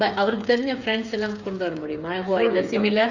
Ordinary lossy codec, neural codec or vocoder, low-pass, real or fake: Opus, 64 kbps; codec, 16 kHz, 8 kbps, FreqCodec, smaller model; 7.2 kHz; fake